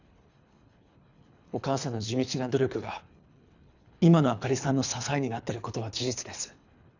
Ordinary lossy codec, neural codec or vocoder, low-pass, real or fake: none; codec, 24 kHz, 3 kbps, HILCodec; 7.2 kHz; fake